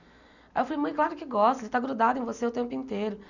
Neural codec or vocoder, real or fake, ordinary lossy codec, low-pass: none; real; none; 7.2 kHz